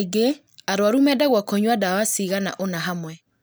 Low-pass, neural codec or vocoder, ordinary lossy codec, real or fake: none; none; none; real